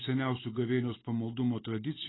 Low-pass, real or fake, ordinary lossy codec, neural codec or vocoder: 7.2 kHz; real; AAC, 16 kbps; none